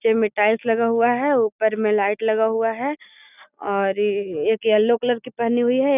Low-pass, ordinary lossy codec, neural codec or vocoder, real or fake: 3.6 kHz; none; none; real